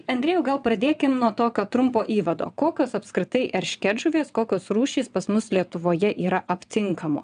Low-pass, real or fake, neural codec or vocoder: 9.9 kHz; fake; vocoder, 22.05 kHz, 80 mel bands, WaveNeXt